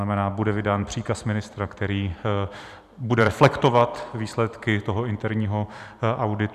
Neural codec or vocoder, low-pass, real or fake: vocoder, 44.1 kHz, 128 mel bands every 512 samples, BigVGAN v2; 14.4 kHz; fake